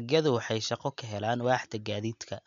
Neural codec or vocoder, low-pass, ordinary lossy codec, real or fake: none; 7.2 kHz; MP3, 64 kbps; real